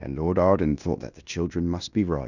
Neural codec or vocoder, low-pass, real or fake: codec, 16 kHz in and 24 kHz out, 0.9 kbps, LongCat-Audio-Codec, fine tuned four codebook decoder; 7.2 kHz; fake